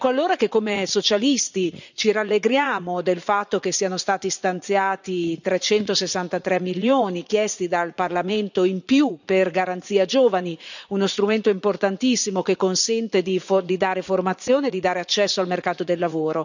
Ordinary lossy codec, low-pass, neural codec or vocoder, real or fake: none; 7.2 kHz; vocoder, 44.1 kHz, 80 mel bands, Vocos; fake